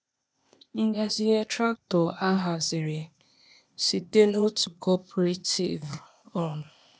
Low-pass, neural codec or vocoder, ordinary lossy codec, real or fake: none; codec, 16 kHz, 0.8 kbps, ZipCodec; none; fake